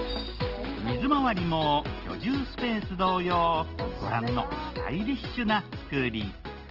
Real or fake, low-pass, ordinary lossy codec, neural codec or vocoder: real; 5.4 kHz; Opus, 16 kbps; none